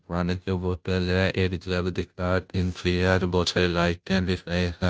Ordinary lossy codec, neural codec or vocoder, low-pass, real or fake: none; codec, 16 kHz, 0.5 kbps, FunCodec, trained on Chinese and English, 25 frames a second; none; fake